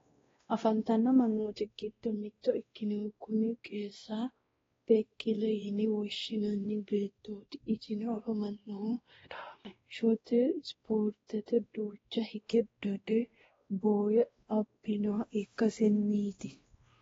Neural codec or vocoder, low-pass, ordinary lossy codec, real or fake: codec, 16 kHz, 1 kbps, X-Codec, WavLM features, trained on Multilingual LibriSpeech; 7.2 kHz; AAC, 24 kbps; fake